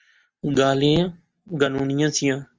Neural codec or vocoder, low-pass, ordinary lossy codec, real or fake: none; 7.2 kHz; Opus, 24 kbps; real